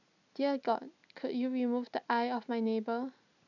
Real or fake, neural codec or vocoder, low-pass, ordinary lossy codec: real; none; 7.2 kHz; none